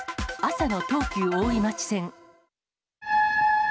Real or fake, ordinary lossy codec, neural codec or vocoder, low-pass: real; none; none; none